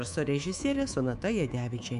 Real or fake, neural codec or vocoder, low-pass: fake; codec, 24 kHz, 3.1 kbps, DualCodec; 10.8 kHz